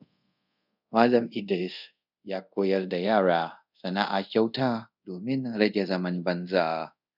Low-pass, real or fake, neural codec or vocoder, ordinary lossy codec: 5.4 kHz; fake; codec, 24 kHz, 0.5 kbps, DualCodec; none